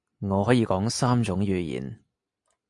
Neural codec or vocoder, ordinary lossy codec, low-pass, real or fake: none; MP3, 64 kbps; 10.8 kHz; real